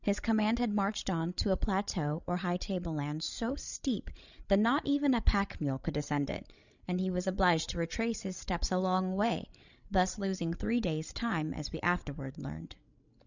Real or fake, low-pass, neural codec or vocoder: fake; 7.2 kHz; codec, 16 kHz, 16 kbps, FreqCodec, larger model